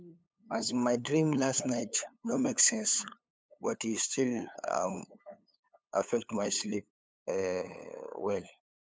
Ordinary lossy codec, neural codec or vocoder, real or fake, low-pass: none; codec, 16 kHz, 8 kbps, FunCodec, trained on LibriTTS, 25 frames a second; fake; none